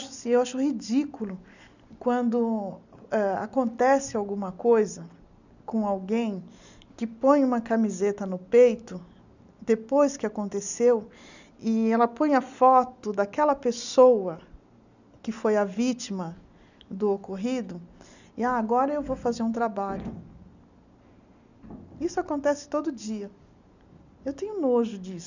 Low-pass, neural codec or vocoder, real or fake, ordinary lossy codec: 7.2 kHz; none; real; none